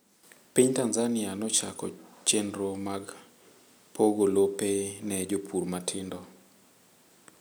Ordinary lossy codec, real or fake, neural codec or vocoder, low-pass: none; real; none; none